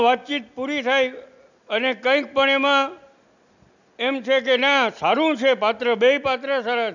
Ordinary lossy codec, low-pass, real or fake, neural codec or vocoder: none; 7.2 kHz; real; none